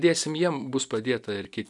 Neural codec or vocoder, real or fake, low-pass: none; real; 10.8 kHz